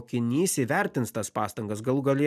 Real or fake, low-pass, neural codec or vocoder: real; 14.4 kHz; none